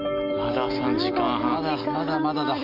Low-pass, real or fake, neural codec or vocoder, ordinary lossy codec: 5.4 kHz; real; none; none